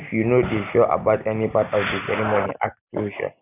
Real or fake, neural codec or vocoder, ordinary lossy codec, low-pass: real; none; none; 3.6 kHz